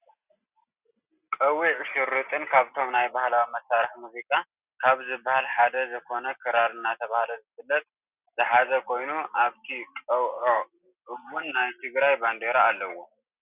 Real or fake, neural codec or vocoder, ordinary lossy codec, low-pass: real; none; Opus, 64 kbps; 3.6 kHz